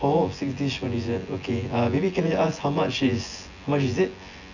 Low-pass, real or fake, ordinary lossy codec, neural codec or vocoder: 7.2 kHz; fake; none; vocoder, 24 kHz, 100 mel bands, Vocos